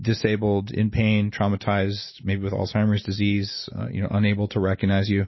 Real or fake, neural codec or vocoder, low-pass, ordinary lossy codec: real; none; 7.2 kHz; MP3, 24 kbps